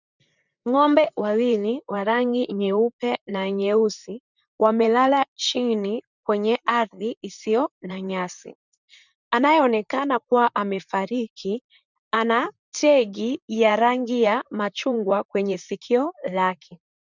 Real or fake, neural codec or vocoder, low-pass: fake; vocoder, 44.1 kHz, 128 mel bands, Pupu-Vocoder; 7.2 kHz